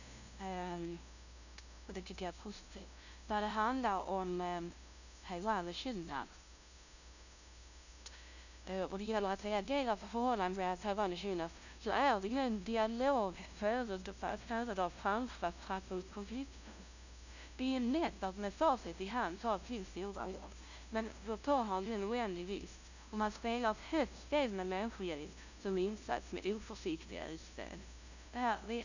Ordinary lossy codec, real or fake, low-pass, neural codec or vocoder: none; fake; 7.2 kHz; codec, 16 kHz, 0.5 kbps, FunCodec, trained on LibriTTS, 25 frames a second